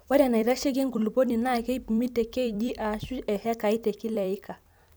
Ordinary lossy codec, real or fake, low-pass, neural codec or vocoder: none; fake; none; vocoder, 44.1 kHz, 128 mel bands every 256 samples, BigVGAN v2